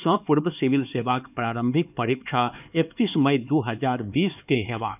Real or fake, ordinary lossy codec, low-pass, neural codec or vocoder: fake; none; 3.6 kHz; codec, 16 kHz, 4 kbps, X-Codec, HuBERT features, trained on LibriSpeech